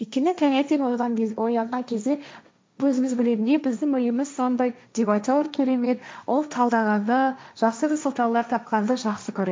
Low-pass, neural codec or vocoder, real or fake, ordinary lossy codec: none; codec, 16 kHz, 1.1 kbps, Voila-Tokenizer; fake; none